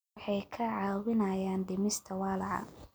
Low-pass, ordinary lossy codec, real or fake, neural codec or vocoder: none; none; real; none